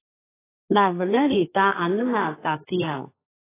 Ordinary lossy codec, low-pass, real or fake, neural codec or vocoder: AAC, 16 kbps; 3.6 kHz; fake; codec, 32 kHz, 1.9 kbps, SNAC